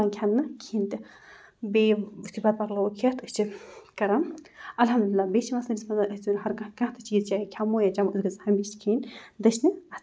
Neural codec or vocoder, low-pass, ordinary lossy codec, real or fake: none; none; none; real